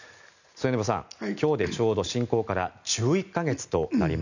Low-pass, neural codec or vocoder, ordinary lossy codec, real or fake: 7.2 kHz; none; none; real